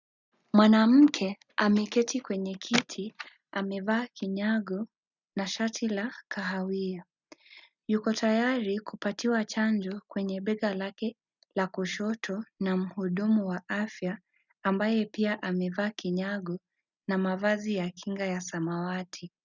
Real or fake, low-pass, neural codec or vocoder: real; 7.2 kHz; none